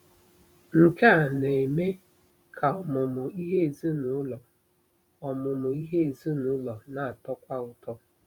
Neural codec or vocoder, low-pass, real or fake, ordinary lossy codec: vocoder, 44.1 kHz, 128 mel bands every 512 samples, BigVGAN v2; 19.8 kHz; fake; none